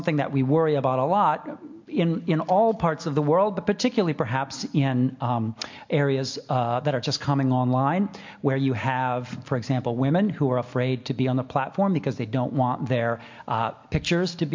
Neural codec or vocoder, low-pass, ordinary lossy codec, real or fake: none; 7.2 kHz; MP3, 48 kbps; real